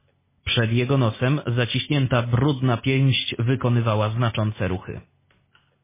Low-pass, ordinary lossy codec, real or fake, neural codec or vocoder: 3.6 kHz; MP3, 16 kbps; real; none